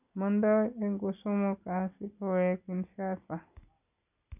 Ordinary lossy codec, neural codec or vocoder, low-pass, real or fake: none; none; 3.6 kHz; real